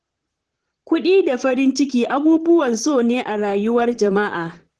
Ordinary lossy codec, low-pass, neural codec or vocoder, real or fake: Opus, 16 kbps; 10.8 kHz; vocoder, 44.1 kHz, 128 mel bands, Pupu-Vocoder; fake